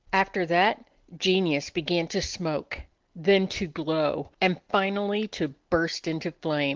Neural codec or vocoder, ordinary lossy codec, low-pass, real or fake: none; Opus, 16 kbps; 7.2 kHz; real